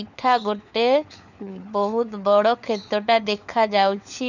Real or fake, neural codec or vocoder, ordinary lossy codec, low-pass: fake; codec, 16 kHz, 4 kbps, FunCodec, trained on LibriTTS, 50 frames a second; none; 7.2 kHz